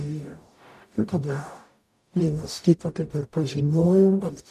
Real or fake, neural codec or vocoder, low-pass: fake; codec, 44.1 kHz, 0.9 kbps, DAC; 14.4 kHz